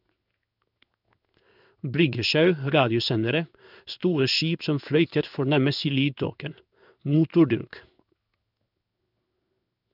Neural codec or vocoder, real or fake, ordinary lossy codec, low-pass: codec, 16 kHz in and 24 kHz out, 1 kbps, XY-Tokenizer; fake; none; 5.4 kHz